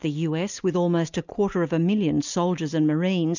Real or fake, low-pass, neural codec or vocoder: real; 7.2 kHz; none